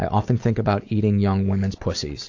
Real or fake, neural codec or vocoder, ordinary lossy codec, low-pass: real; none; AAC, 48 kbps; 7.2 kHz